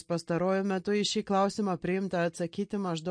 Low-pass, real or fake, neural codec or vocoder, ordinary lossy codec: 9.9 kHz; real; none; MP3, 48 kbps